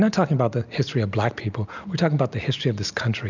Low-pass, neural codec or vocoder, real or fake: 7.2 kHz; none; real